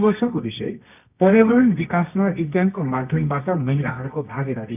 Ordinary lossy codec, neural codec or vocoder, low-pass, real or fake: none; codec, 24 kHz, 0.9 kbps, WavTokenizer, medium music audio release; 3.6 kHz; fake